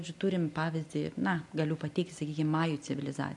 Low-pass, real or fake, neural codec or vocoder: 10.8 kHz; real; none